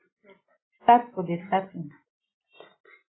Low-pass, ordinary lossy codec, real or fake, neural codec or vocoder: 7.2 kHz; AAC, 16 kbps; real; none